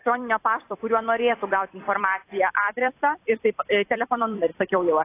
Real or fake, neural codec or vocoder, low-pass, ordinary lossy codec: fake; vocoder, 44.1 kHz, 128 mel bands every 256 samples, BigVGAN v2; 3.6 kHz; AAC, 24 kbps